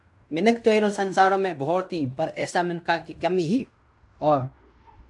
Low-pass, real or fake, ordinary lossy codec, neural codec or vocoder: 10.8 kHz; fake; AAC, 64 kbps; codec, 16 kHz in and 24 kHz out, 0.9 kbps, LongCat-Audio-Codec, fine tuned four codebook decoder